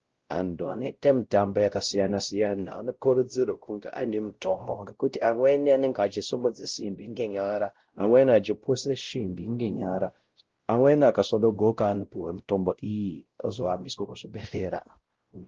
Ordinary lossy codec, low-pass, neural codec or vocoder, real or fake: Opus, 16 kbps; 7.2 kHz; codec, 16 kHz, 0.5 kbps, X-Codec, WavLM features, trained on Multilingual LibriSpeech; fake